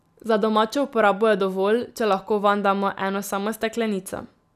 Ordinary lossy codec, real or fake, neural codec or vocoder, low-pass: none; real; none; 14.4 kHz